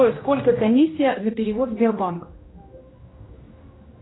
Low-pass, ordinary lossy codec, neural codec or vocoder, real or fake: 7.2 kHz; AAC, 16 kbps; codec, 16 kHz, 1 kbps, X-Codec, HuBERT features, trained on general audio; fake